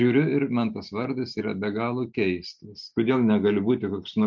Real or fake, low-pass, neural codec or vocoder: real; 7.2 kHz; none